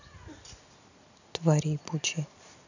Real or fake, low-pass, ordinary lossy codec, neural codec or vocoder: real; 7.2 kHz; none; none